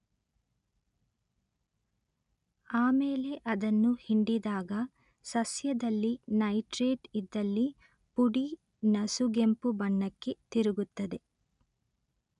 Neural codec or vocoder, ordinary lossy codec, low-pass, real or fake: none; none; 10.8 kHz; real